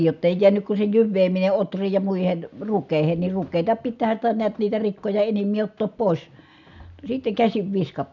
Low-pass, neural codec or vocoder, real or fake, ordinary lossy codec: 7.2 kHz; none; real; none